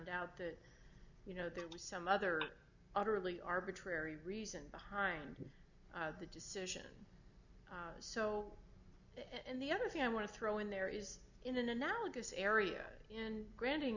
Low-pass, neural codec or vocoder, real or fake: 7.2 kHz; none; real